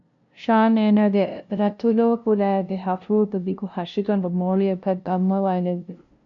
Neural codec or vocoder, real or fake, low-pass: codec, 16 kHz, 0.5 kbps, FunCodec, trained on LibriTTS, 25 frames a second; fake; 7.2 kHz